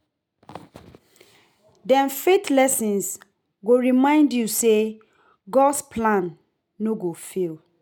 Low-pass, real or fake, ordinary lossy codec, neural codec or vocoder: none; real; none; none